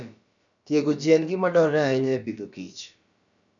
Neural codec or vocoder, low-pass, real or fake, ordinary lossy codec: codec, 16 kHz, about 1 kbps, DyCAST, with the encoder's durations; 7.2 kHz; fake; none